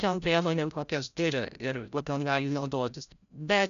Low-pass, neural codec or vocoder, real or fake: 7.2 kHz; codec, 16 kHz, 0.5 kbps, FreqCodec, larger model; fake